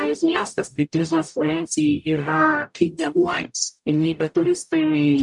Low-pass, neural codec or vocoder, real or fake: 10.8 kHz; codec, 44.1 kHz, 0.9 kbps, DAC; fake